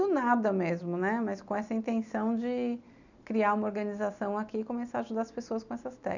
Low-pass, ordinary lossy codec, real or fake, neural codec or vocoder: 7.2 kHz; none; real; none